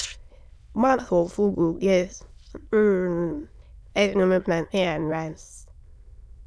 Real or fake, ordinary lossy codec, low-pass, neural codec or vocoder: fake; none; none; autoencoder, 22.05 kHz, a latent of 192 numbers a frame, VITS, trained on many speakers